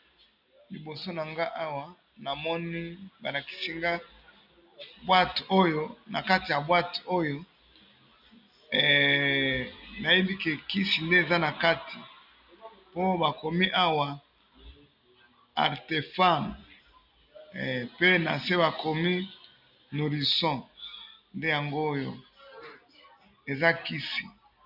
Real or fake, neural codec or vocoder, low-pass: real; none; 5.4 kHz